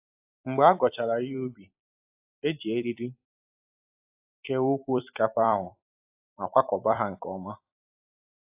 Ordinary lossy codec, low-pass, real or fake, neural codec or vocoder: AAC, 32 kbps; 3.6 kHz; fake; vocoder, 44.1 kHz, 128 mel bands every 256 samples, BigVGAN v2